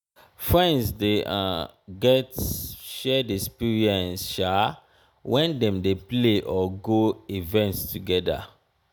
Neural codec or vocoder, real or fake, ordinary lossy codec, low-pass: none; real; none; none